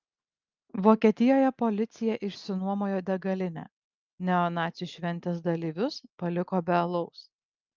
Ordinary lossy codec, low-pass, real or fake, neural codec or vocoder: Opus, 24 kbps; 7.2 kHz; real; none